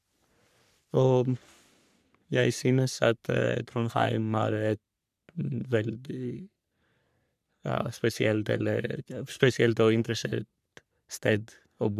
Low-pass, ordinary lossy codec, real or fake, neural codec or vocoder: 14.4 kHz; none; fake; codec, 44.1 kHz, 3.4 kbps, Pupu-Codec